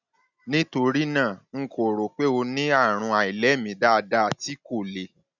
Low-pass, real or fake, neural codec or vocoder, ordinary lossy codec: 7.2 kHz; real; none; none